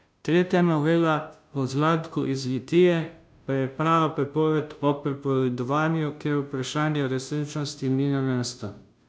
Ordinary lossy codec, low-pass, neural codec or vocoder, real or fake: none; none; codec, 16 kHz, 0.5 kbps, FunCodec, trained on Chinese and English, 25 frames a second; fake